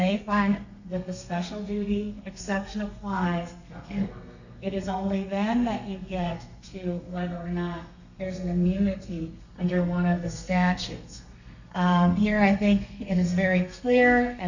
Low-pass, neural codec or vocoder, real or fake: 7.2 kHz; codec, 32 kHz, 1.9 kbps, SNAC; fake